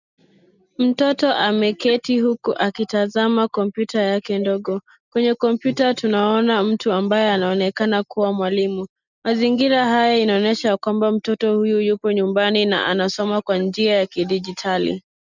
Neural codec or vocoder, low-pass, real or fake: none; 7.2 kHz; real